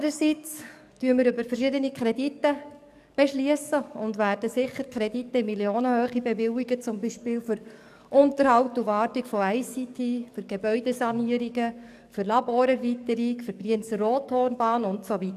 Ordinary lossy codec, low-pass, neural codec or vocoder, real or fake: none; 14.4 kHz; codec, 44.1 kHz, 7.8 kbps, DAC; fake